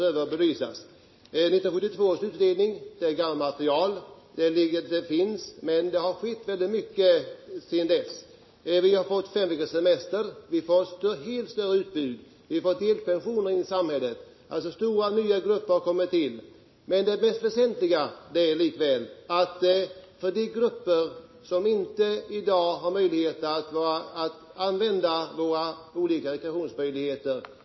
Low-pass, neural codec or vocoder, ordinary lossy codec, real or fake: 7.2 kHz; none; MP3, 24 kbps; real